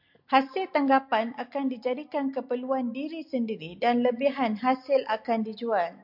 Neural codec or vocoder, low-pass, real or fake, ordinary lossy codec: none; 5.4 kHz; real; MP3, 48 kbps